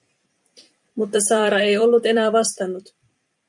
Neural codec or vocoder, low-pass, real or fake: vocoder, 44.1 kHz, 128 mel bands every 256 samples, BigVGAN v2; 10.8 kHz; fake